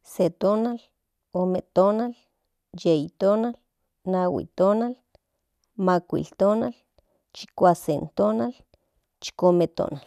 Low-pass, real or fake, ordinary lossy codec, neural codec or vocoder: 14.4 kHz; real; none; none